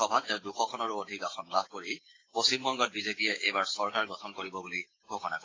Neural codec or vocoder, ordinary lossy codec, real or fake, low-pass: codec, 16 kHz, 6 kbps, DAC; AAC, 32 kbps; fake; 7.2 kHz